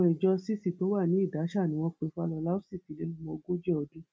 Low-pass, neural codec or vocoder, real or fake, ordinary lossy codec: none; none; real; none